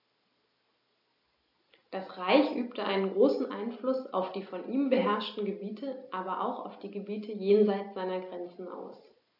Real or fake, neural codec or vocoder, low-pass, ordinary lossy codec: real; none; 5.4 kHz; AAC, 48 kbps